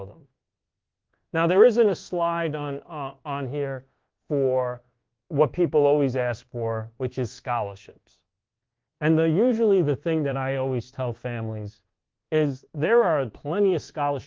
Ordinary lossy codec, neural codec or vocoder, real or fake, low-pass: Opus, 16 kbps; codec, 24 kHz, 1.2 kbps, DualCodec; fake; 7.2 kHz